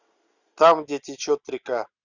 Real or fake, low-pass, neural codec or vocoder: real; 7.2 kHz; none